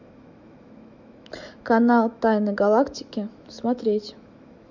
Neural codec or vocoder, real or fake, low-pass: autoencoder, 48 kHz, 128 numbers a frame, DAC-VAE, trained on Japanese speech; fake; 7.2 kHz